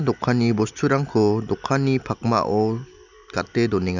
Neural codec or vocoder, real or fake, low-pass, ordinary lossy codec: none; real; 7.2 kHz; none